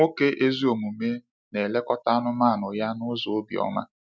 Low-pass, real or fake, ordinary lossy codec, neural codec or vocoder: none; real; none; none